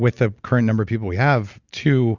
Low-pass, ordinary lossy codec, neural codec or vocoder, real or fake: 7.2 kHz; Opus, 64 kbps; none; real